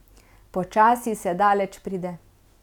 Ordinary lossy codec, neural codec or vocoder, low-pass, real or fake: none; none; 19.8 kHz; real